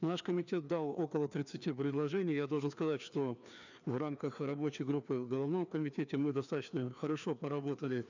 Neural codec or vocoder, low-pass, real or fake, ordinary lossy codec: codec, 16 kHz, 2 kbps, FreqCodec, larger model; 7.2 kHz; fake; none